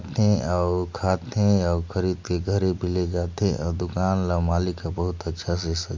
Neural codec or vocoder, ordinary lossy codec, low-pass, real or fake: none; MP3, 48 kbps; 7.2 kHz; real